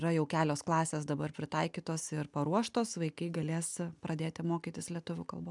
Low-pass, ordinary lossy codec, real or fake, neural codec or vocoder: 10.8 kHz; MP3, 96 kbps; real; none